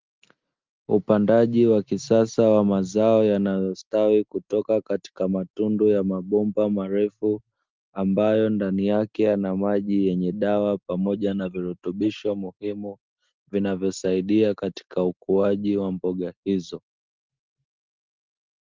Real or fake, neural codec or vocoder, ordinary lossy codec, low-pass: real; none; Opus, 32 kbps; 7.2 kHz